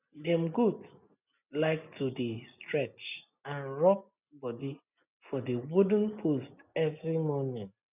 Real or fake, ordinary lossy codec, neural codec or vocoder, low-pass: real; none; none; 3.6 kHz